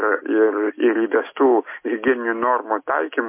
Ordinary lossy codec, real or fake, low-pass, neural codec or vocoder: MP3, 24 kbps; real; 3.6 kHz; none